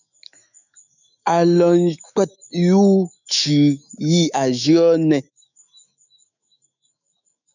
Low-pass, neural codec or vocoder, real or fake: 7.2 kHz; autoencoder, 48 kHz, 128 numbers a frame, DAC-VAE, trained on Japanese speech; fake